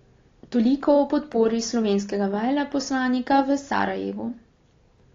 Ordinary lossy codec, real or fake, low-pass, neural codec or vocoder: AAC, 32 kbps; real; 7.2 kHz; none